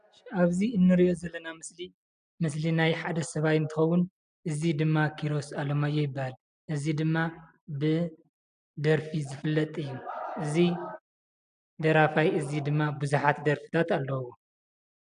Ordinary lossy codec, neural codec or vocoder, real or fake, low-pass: AAC, 96 kbps; none; real; 9.9 kHz